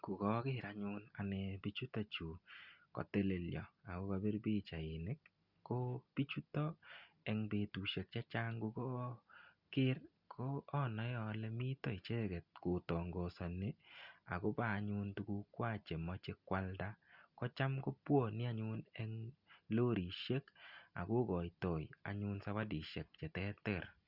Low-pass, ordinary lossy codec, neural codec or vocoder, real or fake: 5.4 kHz; Opus, 64 kbps; none; real